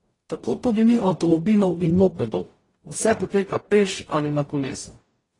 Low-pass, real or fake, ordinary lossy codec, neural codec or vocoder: 10.8 kHz; fake; AAC, 32 kbps; codec, 44.1 kHz, 0.9 kbps, DAC